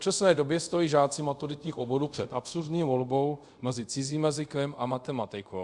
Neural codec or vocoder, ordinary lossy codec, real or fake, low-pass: codec, 24 kHz, 0.5 kbps, DualCodec; Opus, 64 kbps; fake; 10.8 kHz